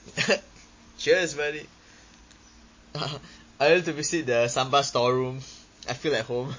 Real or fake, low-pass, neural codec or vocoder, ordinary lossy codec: real; 7.2 kHz; none; MP3, 32 kbps